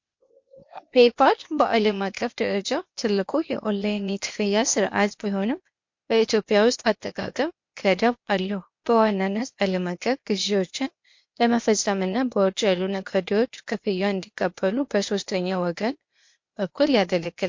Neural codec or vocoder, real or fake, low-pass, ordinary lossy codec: codec, 16 kHz, 0.8 kbps, ZipCodec; fake; 7.2 kHz; MP3, 48 kbps